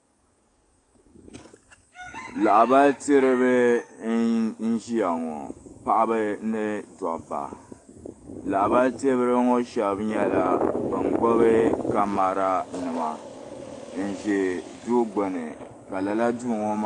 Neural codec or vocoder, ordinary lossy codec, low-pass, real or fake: codec, 44.1 kHz, 7.8 kbps, DAC; AAC, 48 kbps; 10.8 kHz; fake